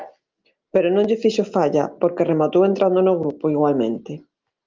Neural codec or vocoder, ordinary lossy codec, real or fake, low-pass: none; Opus, 24 kbps; real; 7.2 kHz